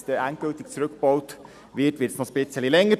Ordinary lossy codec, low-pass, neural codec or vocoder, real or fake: none; 14.4 kHz; none; real